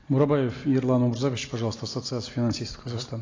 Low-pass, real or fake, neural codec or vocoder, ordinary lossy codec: 7.2 kHz; real; none; AAC, 32 kbps